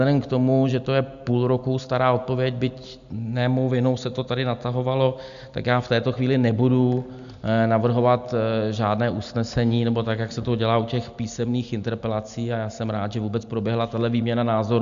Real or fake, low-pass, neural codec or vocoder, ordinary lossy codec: real; 7.2 kHz; none; AAC, 96 kbps